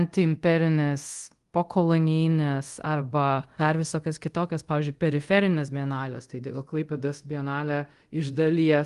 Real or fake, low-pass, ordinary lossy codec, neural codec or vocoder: fake; 10.8 kHz; Opus, 24 kbps; codec, 24 kHz, 0.5 kbps, DualCodec